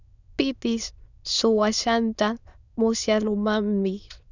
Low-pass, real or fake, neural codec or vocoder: 7.2 kHz; fake; autoencoder, 22.05 kHz, a latent of 192 numbers a frame, VITS, trained on many speakers